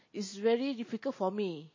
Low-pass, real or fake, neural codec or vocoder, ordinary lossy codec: 7.2 kHz; real; none; MP3, 32 kbps